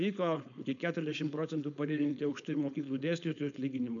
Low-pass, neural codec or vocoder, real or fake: 7.2 kHz; codec, 16 kHz, 4.8 kbps, FACodec; fake